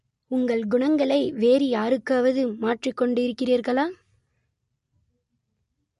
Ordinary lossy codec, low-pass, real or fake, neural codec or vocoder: MP3, 48 kbps; 14.4 kHz; real; none